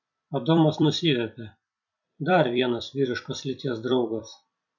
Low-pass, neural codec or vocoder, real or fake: 7.2 kHz; vocoder, 44.1 kHz, 128 mel bands every 256 samples, BigVGAN v2; fake